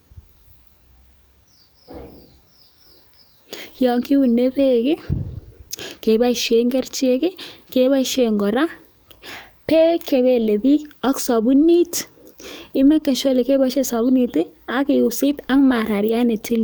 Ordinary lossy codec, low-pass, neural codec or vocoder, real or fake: none; none; codec, 44.1 kHz, 7.8 kbps, DAC; fake